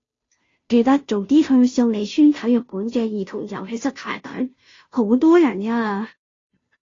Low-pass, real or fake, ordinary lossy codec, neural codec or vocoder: 7.2 kHz; fake; AAC, 32 kbps; codec, 16 kHz, 0.5 kbps, FunCodec, trained on Chinese and English, 25 frames a second